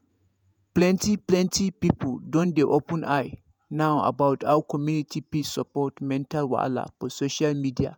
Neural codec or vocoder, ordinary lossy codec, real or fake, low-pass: vocoder, 48 kHz, 128 mel bands, Vocos; none; fake; none